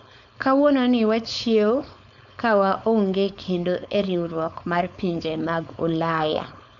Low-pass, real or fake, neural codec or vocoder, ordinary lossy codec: 7.2 kHz; fake; codec, 16 kHz, 4.8 kbps, FACodec; none